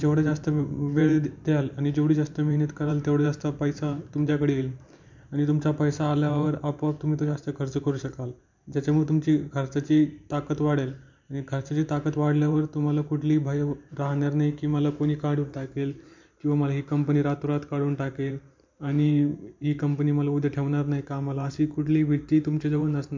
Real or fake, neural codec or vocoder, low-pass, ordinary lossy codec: fake; vocoder, 44.1 kHz, 128 mel bands every 512 samples, BigVGAN v2; 7.2 kHz; MP3, 64 kbps